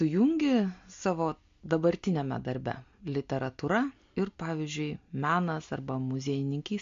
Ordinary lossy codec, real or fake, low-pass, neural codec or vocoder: MP3, 48 kbps; real; 7.2 kHz; none